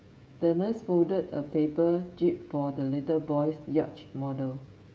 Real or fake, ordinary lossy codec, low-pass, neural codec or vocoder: fake; none; none; codec, 16 kHz, 16 kbps, FreqCodec, smaller model